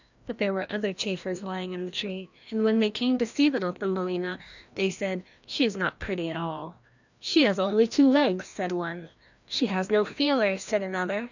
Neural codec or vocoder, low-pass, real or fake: codec, 16 kHz, 1 kbps, FreqCodec, larger model; 7.2 kHz; fake